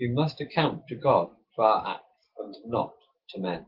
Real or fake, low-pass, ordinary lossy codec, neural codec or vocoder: real; 5.4 kHz; Opus, 16 kbps; none